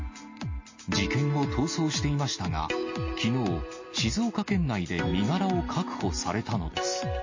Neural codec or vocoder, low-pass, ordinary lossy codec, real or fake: none; 7.2 kHz; MP3, 32 kbps; real